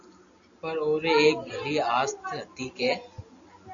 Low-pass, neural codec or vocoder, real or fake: 7.2 kHz; none; real